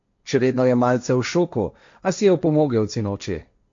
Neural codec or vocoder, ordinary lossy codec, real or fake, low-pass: codec, 16 kHz, 1.1 kbps, Voila-Tokenizer; MP3, 48 kbps; fake; 7.2 kHz